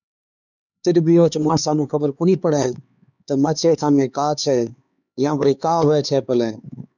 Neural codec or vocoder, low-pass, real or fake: codec, 16 kHz, 4 kbps, X-Codec, HuBERT features, trained on LibriSpeech; 7.2 kHz; fake